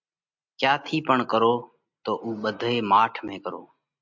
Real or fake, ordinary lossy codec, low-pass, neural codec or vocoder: real; MP3, 64 kbps; 7.2 kHz; none